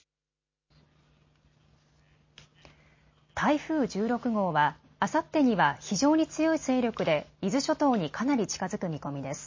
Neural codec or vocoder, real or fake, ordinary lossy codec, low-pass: none; real; MP3, 32 kbps; 7.2 kHz